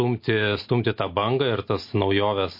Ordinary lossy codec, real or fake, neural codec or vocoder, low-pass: MP3, 32 kbps; real; none; 5.4 kHz